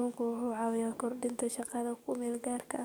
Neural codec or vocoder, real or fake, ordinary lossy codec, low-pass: codec, 44.1 kHz, 7.8 kbps, DAC; fake; none; none